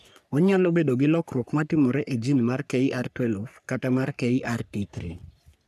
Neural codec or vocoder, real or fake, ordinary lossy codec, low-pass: codec, 44.1 kHz, 3.4 kbps, Pupu-Codec; fake; none; 14.4 kHz